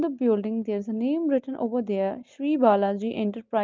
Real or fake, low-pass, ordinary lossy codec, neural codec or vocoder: real; 7.2 kHz; Opus, 32 kbps; none